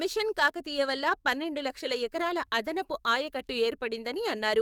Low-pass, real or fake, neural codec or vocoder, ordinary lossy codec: 19.8 kHz; fake; codec, 44.1 kHz, 7.8 kbps, DAC; none